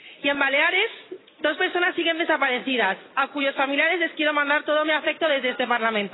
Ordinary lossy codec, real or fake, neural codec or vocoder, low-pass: AAC, 16 kbps; real; none; 7.2 kHz